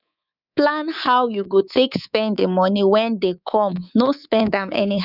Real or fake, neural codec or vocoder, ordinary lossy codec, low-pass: fake; codec, 24 kHz, 3.1 kbps, DualCodec; none; 5.4 kHz